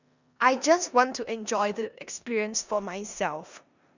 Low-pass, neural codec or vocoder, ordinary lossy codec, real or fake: 7.2 kHz; codec, 16 kHz in and 24 kHz out, 0.9 kbps, LongCat-Audio-Codec, four codebook decoder; none; fake